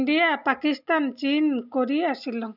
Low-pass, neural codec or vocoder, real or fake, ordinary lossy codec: 5.4 kHz; none; real; none